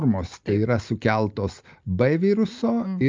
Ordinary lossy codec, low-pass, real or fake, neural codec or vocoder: Opus, 24 kbps; 7.2 kHz; real; none